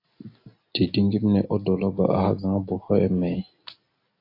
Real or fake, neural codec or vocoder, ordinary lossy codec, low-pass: real; none; AAC, 32 kbps; 5.4 kHz